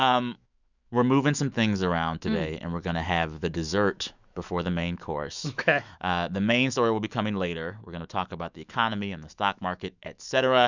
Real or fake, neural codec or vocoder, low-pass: fake; autoencoder, 48 kHz, 128 numbers a frame, DAC-VAE, trained on Japanese speech; 7.2 kHz